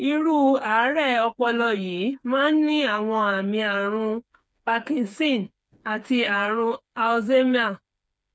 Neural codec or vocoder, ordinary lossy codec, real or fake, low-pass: codec, 16 kHz, 4 kbps, FreqCodec, smaller model; none; fake; none